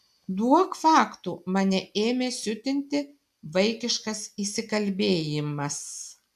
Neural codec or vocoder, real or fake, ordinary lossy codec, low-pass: none; real; AAC, 96 kbps; 14.4 kHz